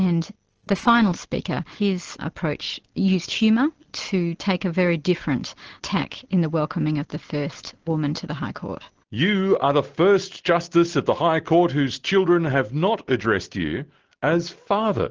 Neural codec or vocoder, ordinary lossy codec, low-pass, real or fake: none; Opus, 16 kbps; 7.2 kHz; real